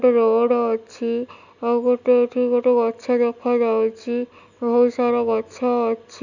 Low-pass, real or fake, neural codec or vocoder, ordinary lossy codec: 7.2 kHz; real; none; none